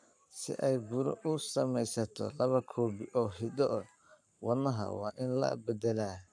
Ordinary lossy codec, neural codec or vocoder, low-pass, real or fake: none; codec, 44.1 kHz, 7.8 kbps, Pupu-Codec; 9.9 kHz; fake